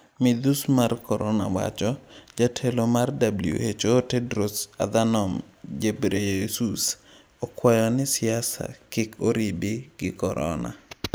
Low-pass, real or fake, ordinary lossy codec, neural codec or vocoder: none; real; none; none